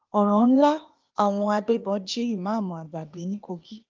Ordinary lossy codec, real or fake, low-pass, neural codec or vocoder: Opus, 32 kbps; fake; 7.2 kHz; codec, 16 kHz, 0.8 kbps, ZipCodec